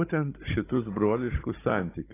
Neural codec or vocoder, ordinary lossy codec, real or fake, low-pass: codec, 16 kHz, 16 kbps, FunCodec, trained on Chinese and English, 50 frames a second; AAC, 16 kbps; fake; 3.6 kHz